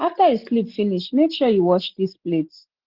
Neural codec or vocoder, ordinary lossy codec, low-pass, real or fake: none; Opus, 16 kbps; 5.4 kHz; real